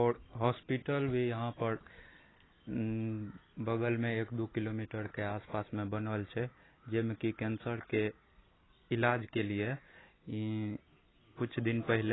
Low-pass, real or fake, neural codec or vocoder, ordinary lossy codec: 7.2 kHz; real; none; AAC, 16 kbps